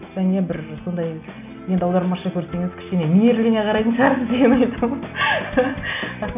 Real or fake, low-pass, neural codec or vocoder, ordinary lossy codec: real; 3.6 kHz; none; none